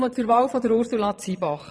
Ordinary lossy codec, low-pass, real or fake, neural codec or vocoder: none; none; fake; vocoder, 22.05 kHz, 80 mel bands, Vocos